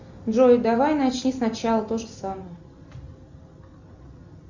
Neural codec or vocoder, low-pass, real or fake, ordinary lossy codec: none; 7.2 kHz; real; Opus, 64 kbps